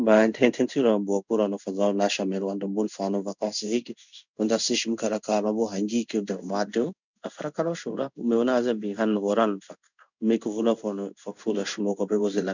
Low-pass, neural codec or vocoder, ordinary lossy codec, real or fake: 7.2 kHz; codec, 16 kHz in and 24 kHz out, 1 kbps, XY-Tokenizer; none; fake